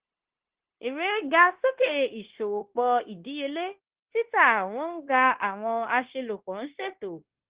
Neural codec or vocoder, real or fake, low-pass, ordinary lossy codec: codec, 16 kHz, 0.9 kbps, LongCat-Audio-Codec; fake; 3.6 kHz; Opus, 16 kbps